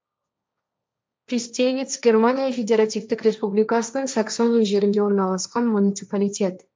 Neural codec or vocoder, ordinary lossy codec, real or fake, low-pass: codec, 16 kHz, 1.1 kbps, Voila-Tokenizer; none; fake; none